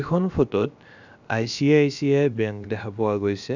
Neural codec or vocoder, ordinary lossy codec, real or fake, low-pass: codec, 16 kHz, about 1 kbps, DyCAST, with the encoder's durations; none; fake; 7.2 kHz